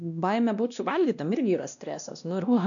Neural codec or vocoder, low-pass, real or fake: codec, 16 kHz, 1 kbps, X-Codec, WavLM features, trained on Multilingual LibriSpeech; 7.2 kHz; fake